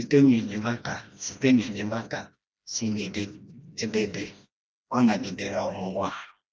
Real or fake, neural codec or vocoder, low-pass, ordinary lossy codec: fake; codec, 16 kHz, 1 kbps, FreqCodec, smaller model; none; none